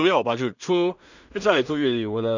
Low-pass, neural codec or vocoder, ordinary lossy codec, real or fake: 7.2 kHz; codec, 16 kHz in and 24 kHz out, 0.4 kbps, LongCat-Audio-Codec, two codebook decoder; none; fake